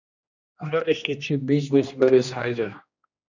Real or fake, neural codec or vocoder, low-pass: fake; codec, 16 kHz, 1 kbps, X-Codec, HuBERT features, trained on general audio; 7.2 kHz